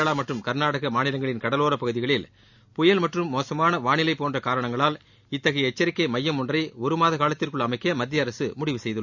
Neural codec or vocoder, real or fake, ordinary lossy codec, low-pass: none; real; none; 7.2 kHz